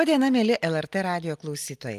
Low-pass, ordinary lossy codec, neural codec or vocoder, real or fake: 14.4 kHz; Opus, 32 kbps; none; real